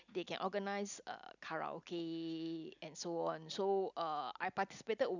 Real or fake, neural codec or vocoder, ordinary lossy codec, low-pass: real; none; none; 7.2 kHz